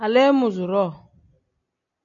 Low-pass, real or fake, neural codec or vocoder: 7.2 kHz; real; none